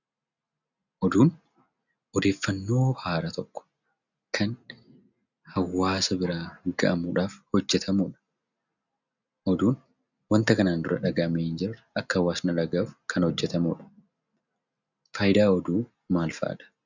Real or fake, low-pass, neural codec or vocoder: real; 7.2 kHz; none